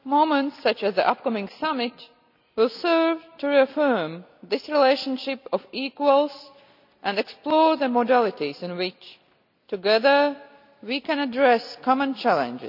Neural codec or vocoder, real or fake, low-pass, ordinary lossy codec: none; real; 5.4 kHz; none